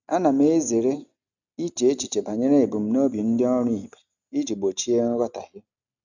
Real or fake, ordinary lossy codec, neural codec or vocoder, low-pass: real; none; none; 7.2 kHz